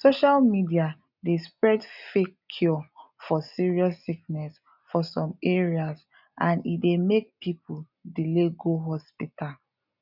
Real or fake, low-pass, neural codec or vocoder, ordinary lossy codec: real; 5.4 kHz; none; none